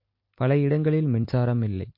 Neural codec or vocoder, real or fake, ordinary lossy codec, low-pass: vocoder, 44.1 kHz, 128 mel bands every 256 samples, BigVGAN v2; fake; MP3, 32 kbps; 5.4 kHz